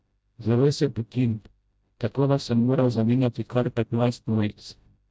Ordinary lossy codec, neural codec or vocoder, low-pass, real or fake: none; codec, 16 kHz, 0.5 kbps, FreqCodec, smaller model; none; fake